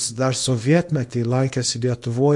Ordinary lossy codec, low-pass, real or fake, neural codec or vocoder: MP3, 48 kbps; 10.8 kHz; fake; codec, 24 kHz, 0.9 kbps, WavTokenizer, small release